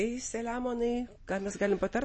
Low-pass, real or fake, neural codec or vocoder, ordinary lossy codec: 9.9 kHz; real; none; MP3, 32 kbps